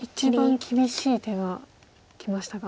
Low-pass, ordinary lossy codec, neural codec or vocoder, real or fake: none; none; none; real